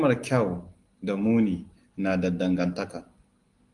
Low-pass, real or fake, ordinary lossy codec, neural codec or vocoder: 10.8 kHz; real; Opus, 24 kbps; none